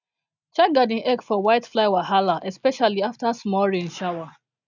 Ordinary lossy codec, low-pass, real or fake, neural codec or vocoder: none; 7.2 kHz; real; none